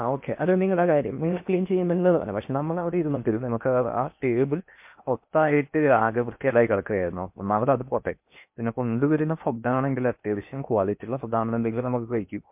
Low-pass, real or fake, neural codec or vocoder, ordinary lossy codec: 3.6 kHz; fake; codec, 16 kHz in and 24 kHz out, 0.8 kbps, FocalCodec, streaming, 65536 codes; MP3, 32 kbps